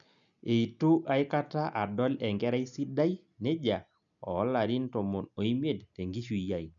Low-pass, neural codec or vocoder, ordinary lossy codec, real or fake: 7.2 kHz; none; none; real